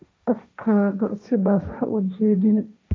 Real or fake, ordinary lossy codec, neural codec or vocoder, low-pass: fake; none; codec, 16 kHz, 1.1 kbps, Voila-Tokenizer; none